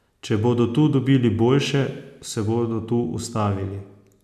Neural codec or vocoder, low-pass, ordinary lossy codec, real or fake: none; 14.4 kHz; none; real